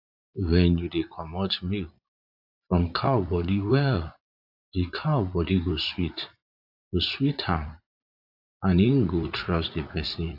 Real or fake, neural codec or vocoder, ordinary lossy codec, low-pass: fake; vocoder, 44.1 kHz, 80 mel bands, Vocos; AAC, 48 kbps; 5.4 kHz